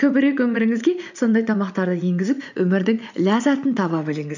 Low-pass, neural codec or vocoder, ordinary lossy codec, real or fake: 7.2 kHz; vocoder, 44.1 kHz, 80 mel bands, Vocos; none; fake